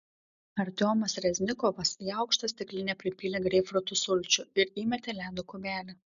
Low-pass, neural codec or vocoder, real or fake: 7.2 kHz; none; real